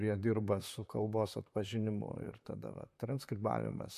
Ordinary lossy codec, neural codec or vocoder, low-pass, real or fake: MP3, 64 kbps; vocoder, 44.1 kHz, 128 mel bands, Pupu-Vocoder; 14.4 kHz; fake